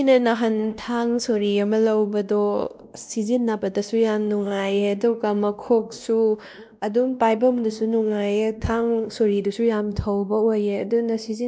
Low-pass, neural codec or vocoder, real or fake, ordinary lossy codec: none; codec, 16 kHz, 1 kbps, X-Codec, WavLM features, trained on Multilingual LibriSpeech; fake; none